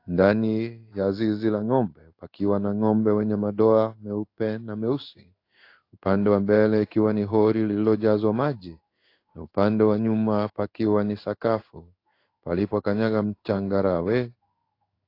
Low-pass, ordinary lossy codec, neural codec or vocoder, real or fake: 5.4 kHz; AAC, 32 kbps; codec, 16 kHz in and 24 kHz out, 1 kbps, XY-Tokenizer; fake